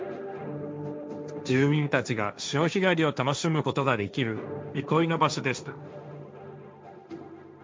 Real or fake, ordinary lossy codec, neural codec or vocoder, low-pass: fake; none; codec, 16 kHz, 1.1 kbps, Voila-Tokenizer; none